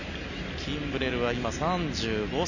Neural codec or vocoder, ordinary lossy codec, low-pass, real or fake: none; none; 7.2 kHz; real